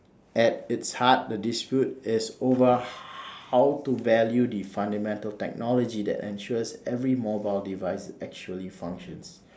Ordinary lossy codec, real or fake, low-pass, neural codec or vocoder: none; real; none; none